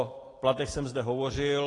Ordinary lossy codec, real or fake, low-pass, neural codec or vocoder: AAC, 32 kbps; real; 10.8 kHz; none